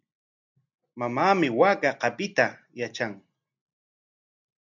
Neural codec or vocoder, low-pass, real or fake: none; 7.2 kHz; real